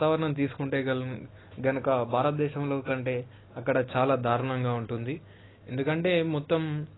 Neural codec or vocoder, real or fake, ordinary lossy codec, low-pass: none; real; AAC, 16 kbps; 7.2 kHz